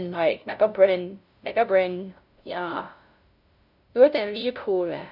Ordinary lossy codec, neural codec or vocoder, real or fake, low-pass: none; codec, 16 kHz, 0.5 kbps, FunCodec, trained on LibriTTS, 25 frames a second; fake; 5.4 kHz